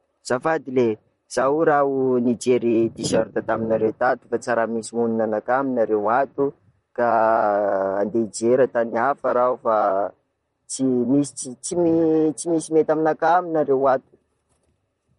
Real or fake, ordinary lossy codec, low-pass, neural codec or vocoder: fake; MP3, 48 kbps; 19.8 kHz; vocoder, 44.1 kHz, 128 mel bands, Pupu-Vocoder